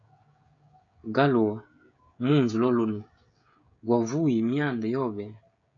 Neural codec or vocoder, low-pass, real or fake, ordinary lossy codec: codec, 16 kHz, 16 kbps, FreqCodec, smaller model; 7.2 kHz; fake; MP3, 64 kbps